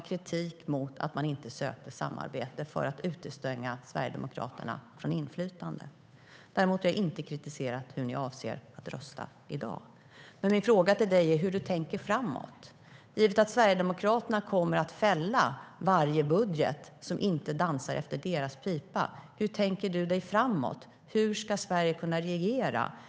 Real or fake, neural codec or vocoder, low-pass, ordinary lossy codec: fake; codec, 16 kHz, 8 kbps, FunCodec, trained on Chinese and English, 25 frames a second; none; none